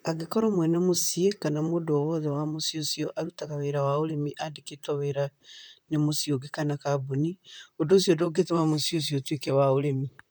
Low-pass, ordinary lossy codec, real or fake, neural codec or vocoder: none; none; fake; vocoder, 44.1 kHz, 128 mel bands, Pupu-Vocoder